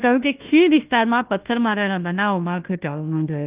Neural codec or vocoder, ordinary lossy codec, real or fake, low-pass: codec, 16 kHz, 1 kbps, FunCodec, trained on Chinese and English, 50 frames a second; Opus, 32 kbps; fake; 3.6 kHz